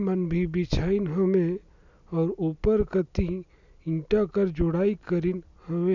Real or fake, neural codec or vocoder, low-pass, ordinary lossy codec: real; none; 7.2 kHz; none